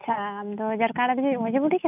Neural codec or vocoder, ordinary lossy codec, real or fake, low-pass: none; none; real; 3.6 kHz